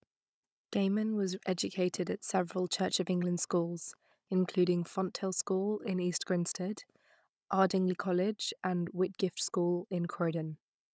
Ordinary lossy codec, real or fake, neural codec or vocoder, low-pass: none; fake; codec, 16 kHz, 16 kbps, FunCodec, trained on Chinese and English, 50 frames a second; none